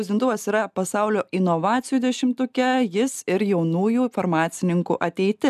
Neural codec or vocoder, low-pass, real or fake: none; 14.4 kHz; real